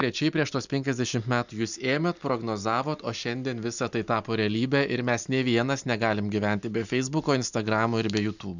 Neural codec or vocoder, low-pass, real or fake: none; 7.2 kHz; real